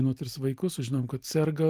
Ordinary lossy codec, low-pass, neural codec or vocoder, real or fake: Opus, 24 kbps; 14.4 kHz; none; real